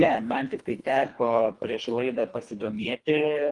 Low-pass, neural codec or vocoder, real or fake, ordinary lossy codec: 10.8 kHz; codec, 24 kHz, 1.5 kbps, HILCodec; fake; Opus, 32 kbps